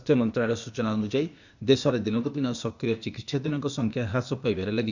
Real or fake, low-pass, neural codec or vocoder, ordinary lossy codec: fake; 7.2 kHz; codec, 16 kHz, 0.8 kbps, ZipCodec; none